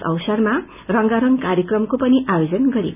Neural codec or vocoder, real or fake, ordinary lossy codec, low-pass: none; real; none; 3.6 kHz